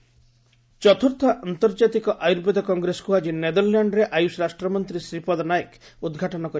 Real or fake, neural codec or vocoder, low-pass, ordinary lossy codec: real; none; none; none